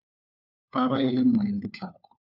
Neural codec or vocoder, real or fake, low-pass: codec, 16 kHz, 4 kbps, FunCodec, trained on LibriTTS, 50 frames a second; fake; 5.4 kHz